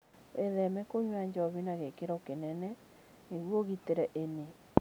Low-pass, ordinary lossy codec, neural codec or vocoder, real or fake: none; none; none; real